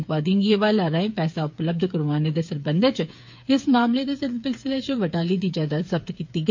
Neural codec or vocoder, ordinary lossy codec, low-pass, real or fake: codec, 16 kHz, 8 kbps, FreqCodec, smaller model; MP3, 48 kbps; 7.2 kHz; fake